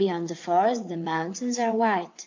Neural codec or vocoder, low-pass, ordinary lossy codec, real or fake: vocoder, 22.05 kHz, 80 mel bands, WaveNeXt; 7.2 kHz; MP3, 64 kbps; fake